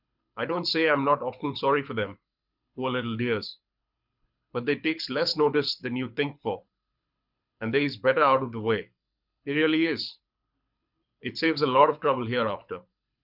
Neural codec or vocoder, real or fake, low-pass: codec, 24 kHz, 6 kbps, HILCodec; fake; 5.4 kHz